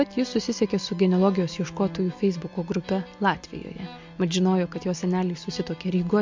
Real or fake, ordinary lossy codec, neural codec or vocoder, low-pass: real; MP3, 48 kbps; none; 7.2 kHz